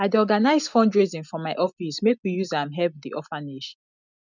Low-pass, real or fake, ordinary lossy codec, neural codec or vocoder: 7.2 kHz; real; none; none